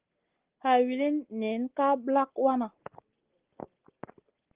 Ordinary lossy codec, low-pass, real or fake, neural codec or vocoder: Opus, 16 kbps; 3.6 kHz; real; none